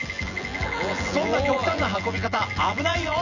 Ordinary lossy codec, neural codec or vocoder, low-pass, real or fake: none; vocoder, 24 kHz, 100 mel bands, Vocos; 7.2 kHz; fake